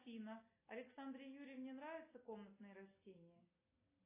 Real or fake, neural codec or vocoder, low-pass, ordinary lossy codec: real; none; 3.6 kHz; AAC, 24 kbps